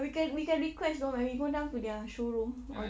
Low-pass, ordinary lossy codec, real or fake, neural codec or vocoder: none; none; real; none